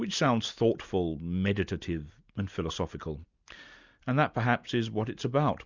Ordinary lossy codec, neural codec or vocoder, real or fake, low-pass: Opus, 64 kbps; none; real; 7.2 kHz